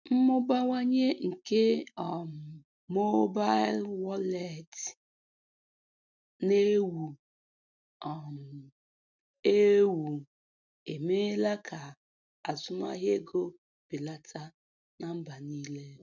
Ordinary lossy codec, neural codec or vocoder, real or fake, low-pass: none; none; real; 7.2 kHz